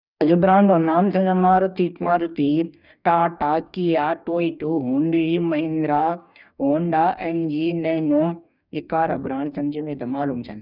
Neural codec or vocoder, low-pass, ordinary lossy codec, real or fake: codec, 44.1 kHz, 2.6 kbps, DAC; 5.4 kHz; none; fake